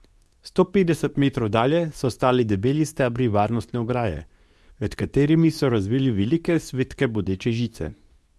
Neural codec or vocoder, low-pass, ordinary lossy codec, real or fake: codec, 24 kHz, 0.9 kbps, WavTokenizer, medium speech release version 2; none; none; fake